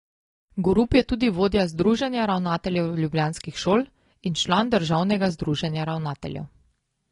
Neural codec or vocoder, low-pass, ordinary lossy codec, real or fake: none; 10.8 kHz; AAC, 32 kbps; real